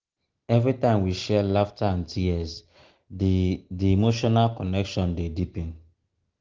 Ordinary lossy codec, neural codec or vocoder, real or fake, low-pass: Opus, 16 kbps; none; real; 7.2 kHz